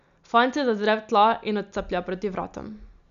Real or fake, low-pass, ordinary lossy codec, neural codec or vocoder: real; 7.2 kHz; none; none